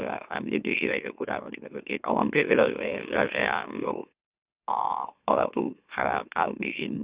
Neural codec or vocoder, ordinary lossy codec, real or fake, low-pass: autoencoder, 44.1 kHz, a latent of 192 numbers a frame, MeloTTS; Opus, 64 kbps; fake; 3.6 kHz